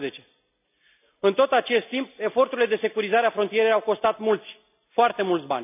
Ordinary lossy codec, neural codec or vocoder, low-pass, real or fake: none; none; 3.6 kHz; real